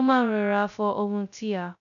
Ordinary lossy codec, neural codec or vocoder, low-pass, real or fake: none; codec, 16 kHz, 0.2 kbps, FocalCodec; 7.2 kHz; fake